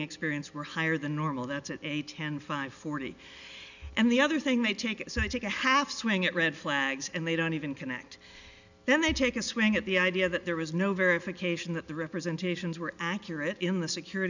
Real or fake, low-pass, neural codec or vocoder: real; 7.2 kHz; none